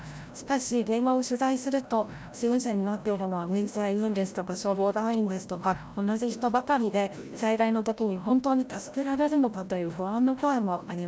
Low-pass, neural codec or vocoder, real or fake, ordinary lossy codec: none; codec, 16 kHz, 0.5 kbps, FreqCodec, larger model; fake; none